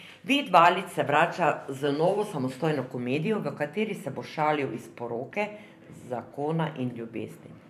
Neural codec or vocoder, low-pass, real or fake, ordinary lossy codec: vocoder, 44.1 kHz, 128 mel bands every 512 samples, BigVGAN v2; 14.4 kHz; fake; none